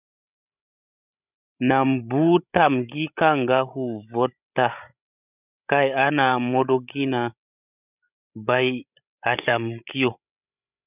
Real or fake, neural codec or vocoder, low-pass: fake; codec, 16 kHz, 16 kbps, FreqCodec, larger model; 3.6 kHz